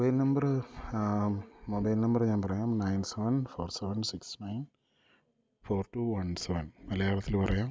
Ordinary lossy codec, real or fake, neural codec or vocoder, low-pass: none; real; none; none